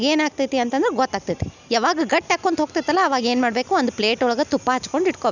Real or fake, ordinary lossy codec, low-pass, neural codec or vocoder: real; none; 7.2 kHz; none